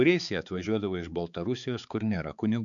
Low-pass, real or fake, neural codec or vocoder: 7.2 kHz; fake; codec, 16 kHz, 4 kbps, X-Codec, HuBERT features, trained on balanced general audio